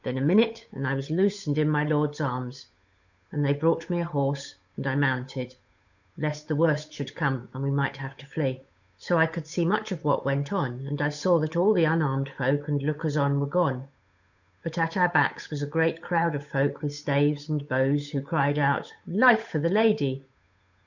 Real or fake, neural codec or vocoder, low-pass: fake; codec, 16 kHz, 8 kbps, FunCodec, trained on Chinese and English, 25 frames a second; 7.2 kHz